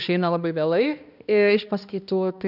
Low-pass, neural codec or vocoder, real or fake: 5.4 kHz; codec, 16 kHz, 1 kbps, X-Codec, HuBERT features, trained on balanced general audio; fake